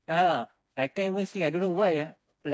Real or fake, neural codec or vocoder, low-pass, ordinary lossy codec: fake; codec, 16 kHz, 2 kbps, FreqCodec, smaller model; none; none